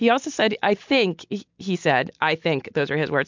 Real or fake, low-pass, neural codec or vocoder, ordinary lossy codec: real; 7.2 kHz; none; MP3, 64 kbps